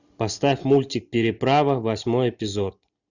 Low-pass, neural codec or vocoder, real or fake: 7.2 kHz; none; real